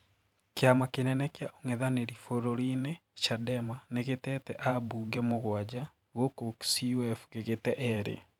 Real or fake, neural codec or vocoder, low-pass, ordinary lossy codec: fake; vocoder, 44.1 kHz, 128 mel bands every 512 samples, BigVGAN v2; 19.8 kHz; none